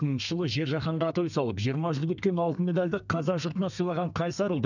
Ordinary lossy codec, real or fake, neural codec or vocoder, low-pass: none; fake; codec, 32 kHz, 1.9 kbps, SNAC; 7.2 kHz